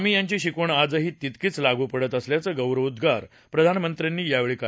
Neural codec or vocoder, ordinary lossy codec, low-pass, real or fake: none; none; none; real